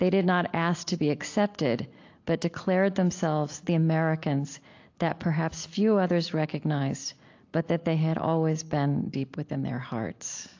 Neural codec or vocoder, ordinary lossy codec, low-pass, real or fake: none; AAC, 48 kbps; 7.2 kHz; real